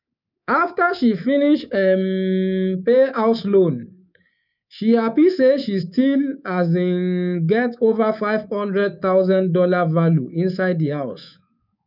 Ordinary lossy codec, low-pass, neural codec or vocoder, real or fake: none; 5.4 kHz; codec, 24 kHz, 3.1 kbps, DualCodec; fake